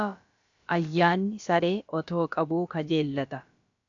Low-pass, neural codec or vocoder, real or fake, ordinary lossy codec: 7.2 kHz; codec, 16 kHz, about 1 kbps, DyCAST, with the encoder's durations; fake; MP3, 96 kbps